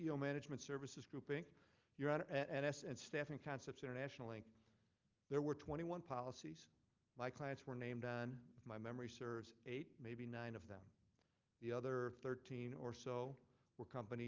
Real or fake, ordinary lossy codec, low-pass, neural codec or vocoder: real; Opus, 24 kbps; 7.2 kHz; none